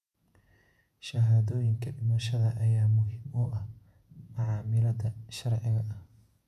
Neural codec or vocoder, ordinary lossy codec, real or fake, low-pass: vocoder, 48 kHz, 128 mel bands, Vocos; none; fake; 14.4 kHz